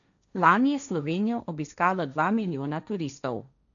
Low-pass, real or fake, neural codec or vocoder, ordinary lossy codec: 7.2 kHz; fake; codec, 16 kHz, 1.1 kbps, Voila-Tokenizer; none